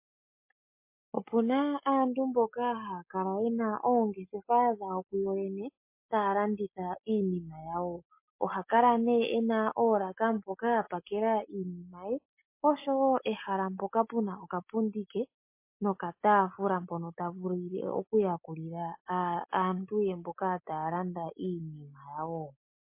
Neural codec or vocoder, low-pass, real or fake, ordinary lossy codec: none; 3.6 kHz; real; MP3, 24 kbps